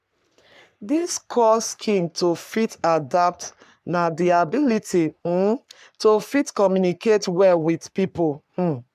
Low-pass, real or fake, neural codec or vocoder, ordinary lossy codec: 14.4 kHz; fake; codec, 44.1 kHz, 3.4 kbps, Pupu-Codec; none